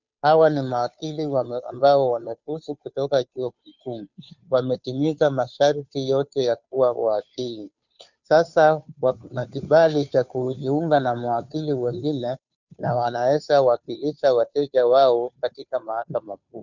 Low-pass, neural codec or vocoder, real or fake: 7.2 kHz; codec, 16 kHz, 2 kbps, FunCodec, trained on Chinese and English, 25 frames a second; fake